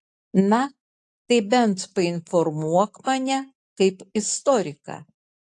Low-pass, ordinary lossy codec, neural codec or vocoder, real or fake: 10.8 kHz; AAC, 48 kbps; none; real